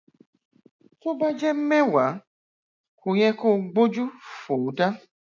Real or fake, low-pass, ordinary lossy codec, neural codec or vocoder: real; 7.2 kHz; none; none